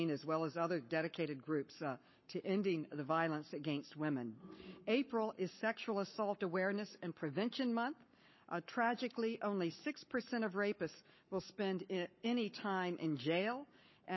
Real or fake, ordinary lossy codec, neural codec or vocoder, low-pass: fake; MP3, 24 kbps; codec, 44.1 kHz, 7.8 kbps, Pupu-Codec; 7.2 kHz